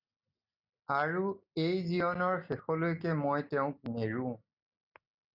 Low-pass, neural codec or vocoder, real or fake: 5.4 kHz; none; real